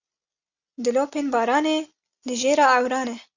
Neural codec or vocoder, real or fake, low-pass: none; real; 7.2 kHz